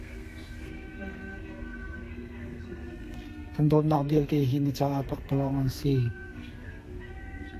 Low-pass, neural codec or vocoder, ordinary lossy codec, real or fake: 14.4 kHz; codec, 32 kHz, 1.9 kbps, SNAC; AAC, 64 kbps; fake